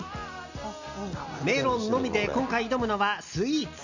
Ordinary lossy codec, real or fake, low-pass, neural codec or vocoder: none; real; 7.2 kHz; none